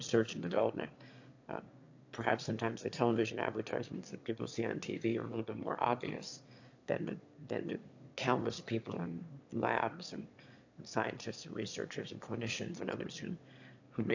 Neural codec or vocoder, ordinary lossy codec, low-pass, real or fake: autoencoder, 22.05 kHz, a latent of 192 numbers a frame, VITS, trained on one speaker; AAC, 48 kbps; 7.2 kHz; fake